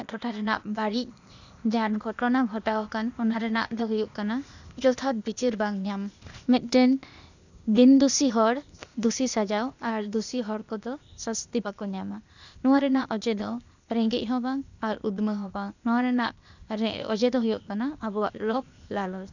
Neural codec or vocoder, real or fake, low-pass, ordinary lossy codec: codec, 16 kHz, 0.8 kbps, ZipCodec; fake; 7.2 kHz; none